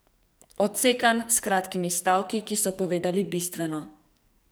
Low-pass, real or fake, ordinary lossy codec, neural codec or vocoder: none; fake; none; codec, 44.1 kHz, 2.6 kbps, SNAC